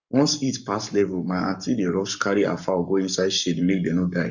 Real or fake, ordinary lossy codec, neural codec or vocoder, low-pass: fake; none; codec, 44.1 kHz, 7.8 kbps, Pupu-Codec; 7.2 kHz